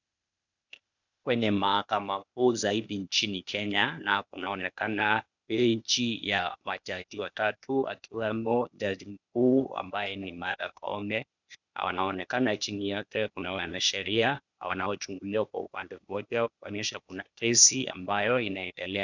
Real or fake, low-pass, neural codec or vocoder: fake; 7.2 kHz; codec, 16 kHz, 0.8 kbps, ZipCodec